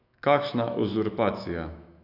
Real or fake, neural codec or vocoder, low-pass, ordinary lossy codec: fake; autoencoder, 48 kHz, 128 numbers a frame, DAC-VAE, trained on Japanese speech; 5.4 kHz; none